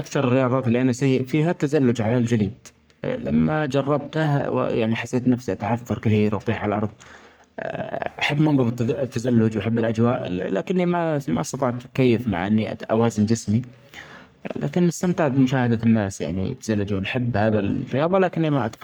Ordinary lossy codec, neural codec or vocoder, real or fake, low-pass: none; codec, 44.1 kHz, 3.4 kbps, Pupu-Codec; fake; none